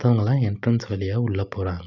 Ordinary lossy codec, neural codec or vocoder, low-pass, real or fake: none; none; 7.2 kHz; real